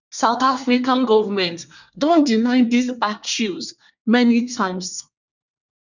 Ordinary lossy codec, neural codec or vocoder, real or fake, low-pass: none; codec, 24 kHz, 1 kbps, SNAC; fake; 7.2 kHz